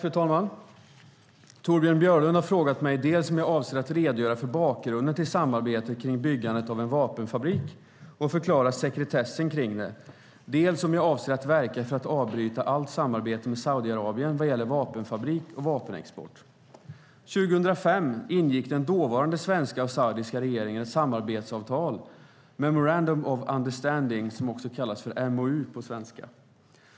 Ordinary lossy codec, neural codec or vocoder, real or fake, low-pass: none; none; real; none